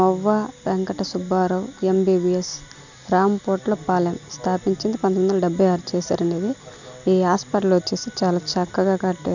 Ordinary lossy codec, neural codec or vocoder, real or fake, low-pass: none; none; real; 7.2 kHz